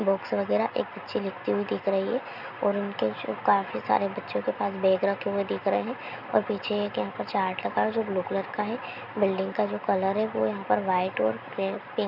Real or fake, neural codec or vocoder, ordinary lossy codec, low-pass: real; none; none; 5.4 kHz